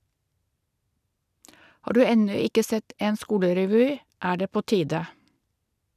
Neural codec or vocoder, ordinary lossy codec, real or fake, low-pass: none; none; real; 14.4 kHz